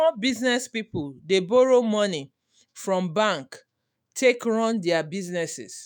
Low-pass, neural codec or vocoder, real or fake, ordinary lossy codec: none; autoencoder, 48 kHz, 128 numbers a frame, DAC-VAE, trained on Japanese speech; fake; none